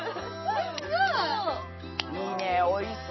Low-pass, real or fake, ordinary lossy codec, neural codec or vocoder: 7.2 kHz; real; MP3, 24 kbps; none